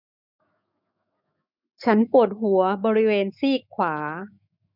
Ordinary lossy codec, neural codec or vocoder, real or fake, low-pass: none; autoencoder, 48 kHz, 128 numbers a frame, DAC-VAE, trained on Japanese speech; fake; 5.4 kHz